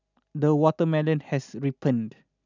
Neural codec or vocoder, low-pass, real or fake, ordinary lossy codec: none; 7.2 kHz; real; none